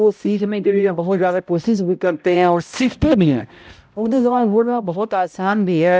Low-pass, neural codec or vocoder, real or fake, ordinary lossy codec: none; codec, 16 kHz, 0.5 kbps, X-Codec, HuBERT features, trained on balanced general audio; fake; none